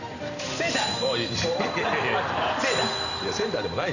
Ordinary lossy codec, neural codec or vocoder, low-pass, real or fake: none; none; 7.2 kHz; real